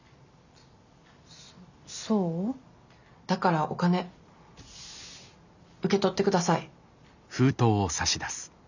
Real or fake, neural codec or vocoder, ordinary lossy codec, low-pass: real; none; none; 7.2 kHz